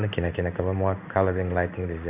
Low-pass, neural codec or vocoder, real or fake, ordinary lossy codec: 3.6 kHz; codec, 16 kHz in and 24 kHz out, 1 kbps, XY-Tokenizer; fake; none